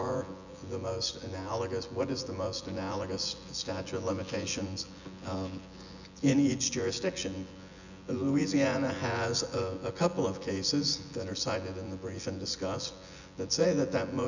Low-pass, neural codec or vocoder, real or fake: 7.2 kHz; vocoder, 24 kHz, 100 mel bands, Vocos; fake